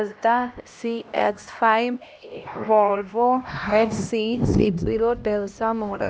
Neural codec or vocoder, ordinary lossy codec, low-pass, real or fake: codec, 16 kHz, 1 kbps, X-Codec, HuBERT features, trained on LibriSpeech; none; none; fake